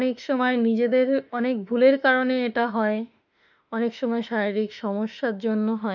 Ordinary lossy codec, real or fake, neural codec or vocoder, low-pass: none; fake; autoencoder, 48 kHz, 32 numbers a frame, DAC-VAE, trained on Japanese speech; 7.2 kHz